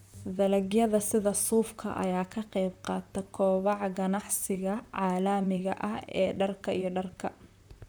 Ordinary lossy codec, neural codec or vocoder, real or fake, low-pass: none; vocoder, 44.1 kHz, 128 mel bands, Pupu-Vocoder; fake; none